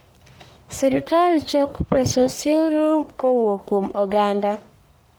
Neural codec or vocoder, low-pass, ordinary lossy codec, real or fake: codec, 44.1 kHz, 1.7 kbps, Pupu-Codec; none; none; fake